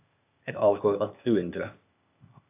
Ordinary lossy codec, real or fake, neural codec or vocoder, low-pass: AAC, 32 kbps; fake; codec, 16 kHz, 0.8 kbps, ZipCodec; 3.6 kHz